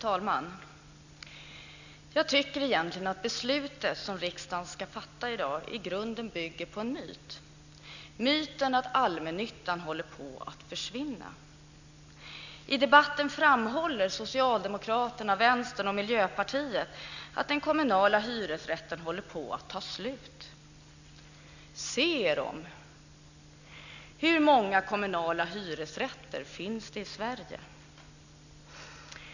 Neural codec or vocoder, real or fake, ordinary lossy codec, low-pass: none; real; none; 7.2 kHz